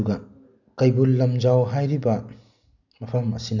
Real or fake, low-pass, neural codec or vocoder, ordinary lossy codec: real; 7.2 kHz; none; none